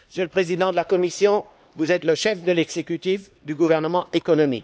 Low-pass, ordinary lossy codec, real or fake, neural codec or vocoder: none; none; fake; codec, 16 kHz, 2 kbps, X-Codec, HuBERT features, trained on LibriSpeech